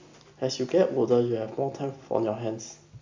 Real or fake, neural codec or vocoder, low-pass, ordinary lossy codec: real; none; 7.2 kHz; MP3, 64 kbps